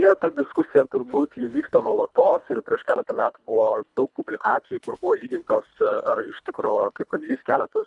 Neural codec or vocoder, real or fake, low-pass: codec, 24 kHz, 1.5 kbps, HILCodec; fake; 10.8 kHz